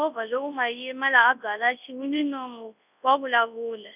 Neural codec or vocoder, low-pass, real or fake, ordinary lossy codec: codec, 24 kHz, 0.9 kbps, WavTokenizer, large speech release; 3.6 kHz; fake; none